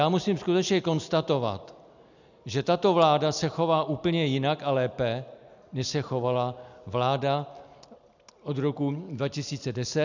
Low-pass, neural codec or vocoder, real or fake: 7.2 kHz; none; real